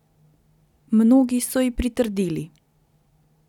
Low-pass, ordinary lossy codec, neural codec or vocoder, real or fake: 19.8 kHz; none; none; real